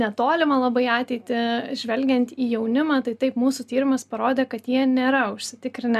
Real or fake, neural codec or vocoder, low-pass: real; none; 14.4 kHz